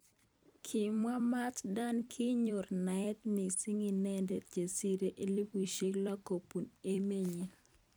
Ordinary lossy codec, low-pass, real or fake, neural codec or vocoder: none; none; fake; vocoder, 44.1 kHz, 128 mel bands every 256 samples, BigVGAN v2